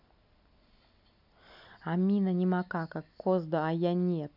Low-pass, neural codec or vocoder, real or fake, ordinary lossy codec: 5.4 kHz; none; real; none